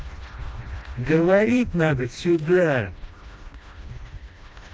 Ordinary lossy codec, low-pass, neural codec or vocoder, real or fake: none; none; codec, 16 kHz, 1 kbps, FreqCodec, smaller model; fake